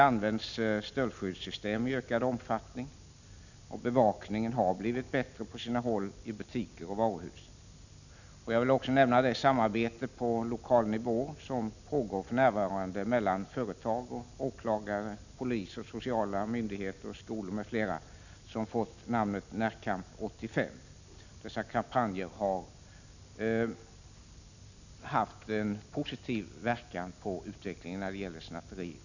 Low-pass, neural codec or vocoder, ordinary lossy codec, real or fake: 7.2 kHz; none; none; real